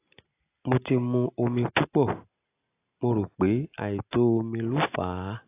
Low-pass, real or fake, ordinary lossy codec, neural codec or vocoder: 3.6 kHz; real; AAC, 24 kbps; none